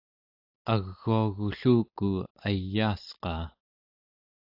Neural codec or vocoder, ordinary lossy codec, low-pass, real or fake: none; AAC, 48 kbps; 5.4 kHz; real